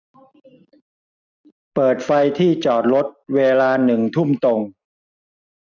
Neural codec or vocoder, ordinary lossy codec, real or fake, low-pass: none; none; real; 7.2 kHz